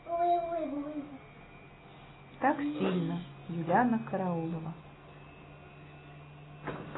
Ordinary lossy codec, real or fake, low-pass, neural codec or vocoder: AAC, 16 kbps; real; 7.2 kHz; none